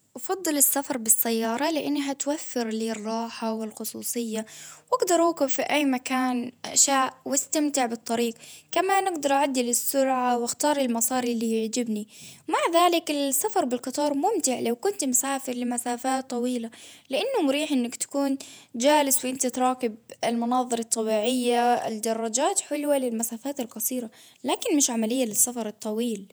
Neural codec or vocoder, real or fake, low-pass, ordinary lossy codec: vocoder, 48 kHz, 128 mel bands, Vocos; fake; none; none